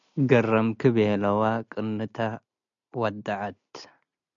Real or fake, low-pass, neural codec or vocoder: real; 7.2 kHz; none